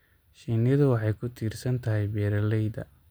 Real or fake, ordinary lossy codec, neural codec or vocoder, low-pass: real; none; none; none